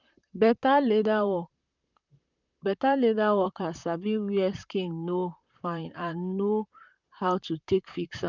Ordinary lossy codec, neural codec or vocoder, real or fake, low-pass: none; vocoder, 44.1 kHz, 128 mel bands, Pupu-Vocoder; fake; 7.2 kHz